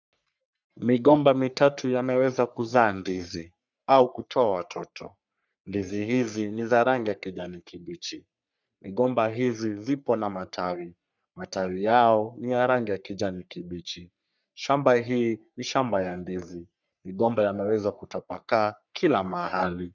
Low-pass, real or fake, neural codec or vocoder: 7.2 kHz; fake; codec, 44.1 kHz, 3.4 kbps, Pupu-Codec